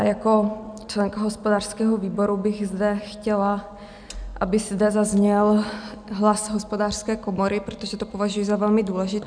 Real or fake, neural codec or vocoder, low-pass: real; none; 9.9 kHz